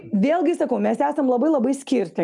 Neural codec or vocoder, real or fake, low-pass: none; real; 10.8 kHz